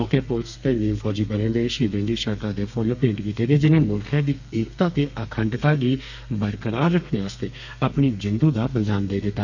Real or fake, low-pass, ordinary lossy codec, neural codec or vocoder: fake; 7.2 kHz; none; codec, 32 kHz, 1.9 kbps, SNAC